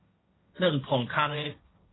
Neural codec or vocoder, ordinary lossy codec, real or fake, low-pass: codec, 16 kHz, 1.1 kbps, Voila-Tokenizer; AAC, 16 kbps; fake; 7.2 kHz